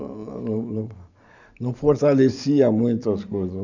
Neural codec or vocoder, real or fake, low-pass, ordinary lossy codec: vocoder, 44.1 kHz, 128 mel bands every 512 samples, BigVGAN v2; fake; 7.2 kHz; none